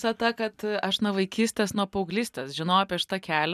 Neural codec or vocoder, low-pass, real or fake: none; 14.4 kHz; real